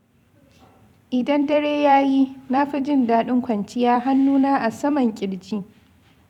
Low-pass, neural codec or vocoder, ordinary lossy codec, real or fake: 19.8 kHz; vocoder, 44.1 kHz, 128 mel bands every 256 samples, BigVGAN v2; none; fake